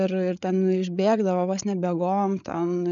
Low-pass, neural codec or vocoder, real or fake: 7.2 kHz; codec, 16 kHz, 8 kbps, FreqCodec, larger model; fake